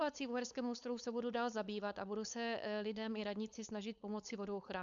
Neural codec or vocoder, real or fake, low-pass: codec, 16 kHz, 4.8 kbps, FACodec; fake; 7.2 kHz